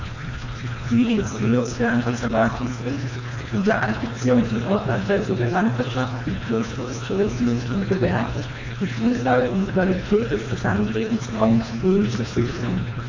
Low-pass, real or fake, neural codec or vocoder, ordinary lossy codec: 7.2 kHz; fake; codec, 24 kHz, 1.5 kbps, HILCodec; MP3, 48 kbps